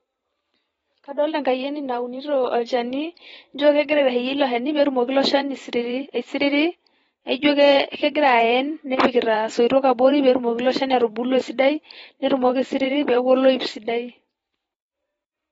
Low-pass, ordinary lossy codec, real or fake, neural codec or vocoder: 19.8 kHz; AAC, 24 kbps; fake; vocoder, 44.1 kHz, 128 mel bands every 512 samples, BigVGAN v2